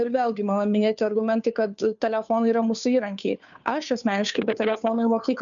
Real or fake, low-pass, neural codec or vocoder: fake; 7.2 kHz; codec, 16 kHz, 2 kbps, FunCodec, trained on Chinese and English, 25 frames a second